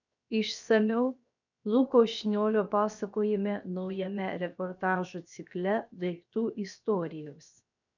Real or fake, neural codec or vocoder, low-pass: fake; codec, 16 kHz, 0.7 kbps, FocalCodec; 7.2 kHz